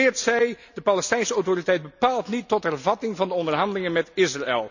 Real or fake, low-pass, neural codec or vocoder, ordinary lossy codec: real; 7.2 kHz; none; none